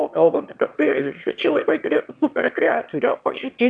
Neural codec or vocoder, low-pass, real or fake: autoencoder, 22.05 kHz, a latent of 192 numbers a frame, VITS, trained on one speaker; 9.9 kHz; fake